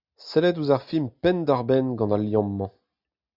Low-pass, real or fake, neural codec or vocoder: 5.4 kHz; real; none